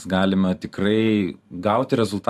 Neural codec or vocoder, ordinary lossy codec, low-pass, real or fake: vocoder, 44.1 kHz, 128 mel bands every 512 samples, BigVGAN v2; AAC, 96 kbps; 14.4 kHz; fake